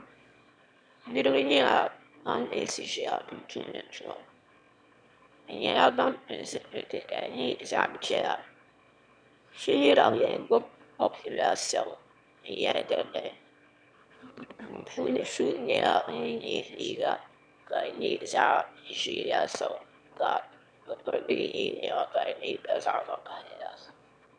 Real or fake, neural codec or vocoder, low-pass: fake; autoencoder, 22.05 kHz, a latent of 192 numbers a frame, VITS, trained on one speaker; 9.9 kHz